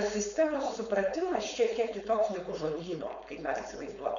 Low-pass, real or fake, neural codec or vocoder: 7.2 kHz; fake; codec, 16 kHz, 4.8 kbps, FACodec